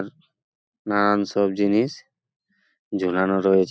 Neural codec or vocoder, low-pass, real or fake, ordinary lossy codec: none; none; real; none